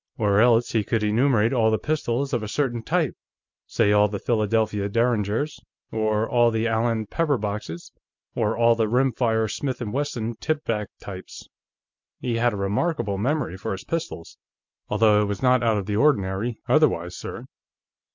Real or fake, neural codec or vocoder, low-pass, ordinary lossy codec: real; none; 7.2 kHz; MP3, 64 kbps